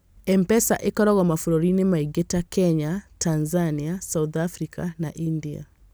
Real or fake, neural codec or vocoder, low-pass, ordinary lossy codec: real; none; none; none